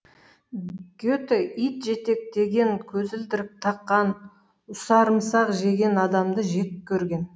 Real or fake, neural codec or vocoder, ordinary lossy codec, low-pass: real; none; none; none